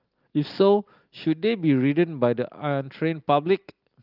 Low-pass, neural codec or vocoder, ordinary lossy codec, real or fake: 5.4 kHz; none; Opus, 32 kbps; real